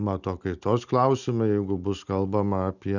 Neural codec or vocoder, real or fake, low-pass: none; real; 7.2 kHz